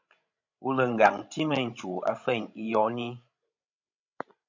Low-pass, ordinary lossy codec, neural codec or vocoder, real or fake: 7.2 kHz; MP3, 64 kbps; codec, 16 kHz, 16 kbps, FreqCodec, larger model; fake